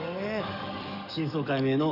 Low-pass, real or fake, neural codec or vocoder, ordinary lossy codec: 5.4 kHz; fake; codec, 44.1 kHz, 7.8 kbps, DAC; none